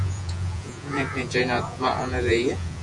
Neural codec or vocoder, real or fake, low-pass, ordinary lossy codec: vocoder, 48 kHz, 128 mel bands, Vocos; fake; 10.8 kHz; Opus, 64 kbps